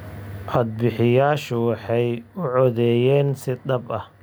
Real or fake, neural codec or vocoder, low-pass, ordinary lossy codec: real; none; none; none